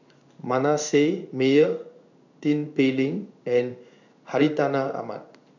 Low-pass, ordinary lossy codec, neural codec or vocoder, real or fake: 7.2 kHz; none; codec, 16 kHz in and 24 kHz out, 1 kbps, XY-Tokenizer; fake